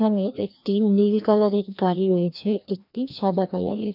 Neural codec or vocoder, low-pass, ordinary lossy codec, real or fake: codec, 16 kHz, 1 kbps, FreqCodec, larger model; 5.4 kHz; none; fake